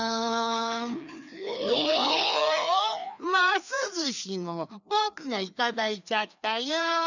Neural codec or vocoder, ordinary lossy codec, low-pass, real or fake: codec, 16 kHz, 2 kbps, FreqCodec, larger model; none; 7.2 kHz; fake